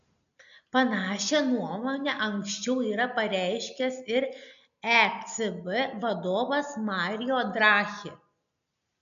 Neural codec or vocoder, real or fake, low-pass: none; real; 7.2 kHz